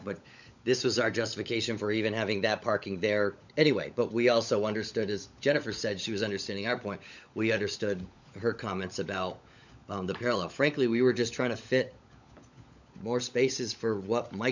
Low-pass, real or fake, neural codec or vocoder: 7.2 kHz; fake; codec, 16 kHz, 16 kbps, FunCodec, trained on LibriTTS, 50 frames a second